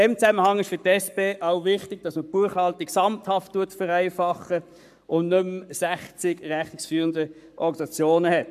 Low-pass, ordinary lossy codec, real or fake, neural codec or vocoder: 14.4 kHz; MP3, 96 kbps; fake; codec, 44.1 kHz, 7.8 kbps, Pupu-Codec